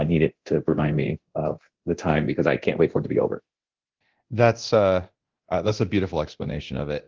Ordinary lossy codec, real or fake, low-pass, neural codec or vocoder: Opus, 16 kbps; fake; 7.2 kHz; codec, 24 kHz, 0.9 kbps, DualCodec